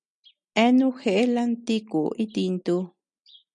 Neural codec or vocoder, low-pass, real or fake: none; 9.9 kHz; real